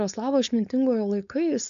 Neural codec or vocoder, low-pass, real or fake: codec, 16 kHz, 4.8 kbps, FACodec; 7.2 kHz; fake